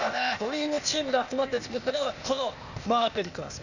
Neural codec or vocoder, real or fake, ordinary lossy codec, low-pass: codec, 16 kHz, 0.8 kbps, ZipCodec; fake; none; 7.2 kHz